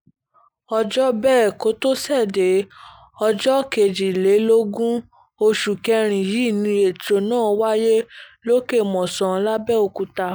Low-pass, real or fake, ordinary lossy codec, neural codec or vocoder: none; real; none; none